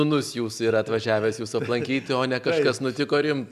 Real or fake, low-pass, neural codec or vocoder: real; 14.4 kHz; none